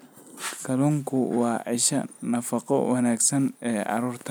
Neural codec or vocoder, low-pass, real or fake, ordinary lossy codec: none; none; real; none